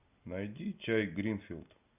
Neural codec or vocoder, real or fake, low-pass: none; real; 3.6 kHz